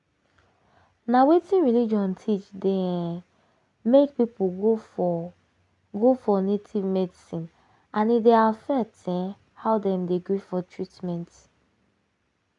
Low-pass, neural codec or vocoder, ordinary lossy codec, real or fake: 10.8 kHz; none; none; real